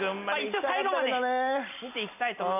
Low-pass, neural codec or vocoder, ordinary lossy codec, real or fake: 3.6 kHz; none; none; real